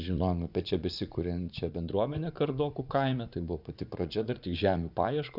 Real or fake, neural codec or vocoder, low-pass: fake; vocoder, 44.1 kHz, 80 mel bands, Vocos; 5.4 kHz